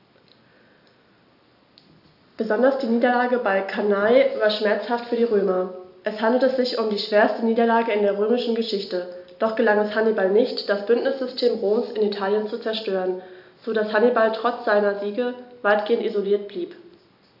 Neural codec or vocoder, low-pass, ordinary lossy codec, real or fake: none; 5.4 kHz; AAC, 48 kbps; real